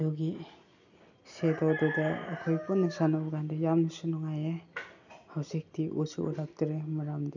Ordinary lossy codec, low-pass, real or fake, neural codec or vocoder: none; 7.2 kHz; real; none